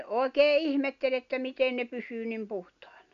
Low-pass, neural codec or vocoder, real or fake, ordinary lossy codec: 7.2 kHz; none; real; none